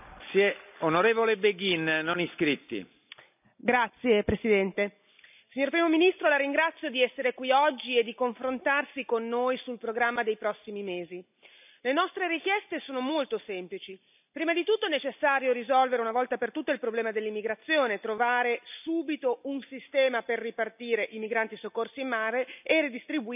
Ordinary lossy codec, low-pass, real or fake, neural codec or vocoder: none; 3.6 kHz; real; none